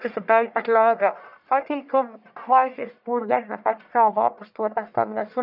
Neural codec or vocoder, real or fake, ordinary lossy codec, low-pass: codec, 44.1 kHz, 1.7 kbps, Pupu-Codec; fake; none; 5.4 kHz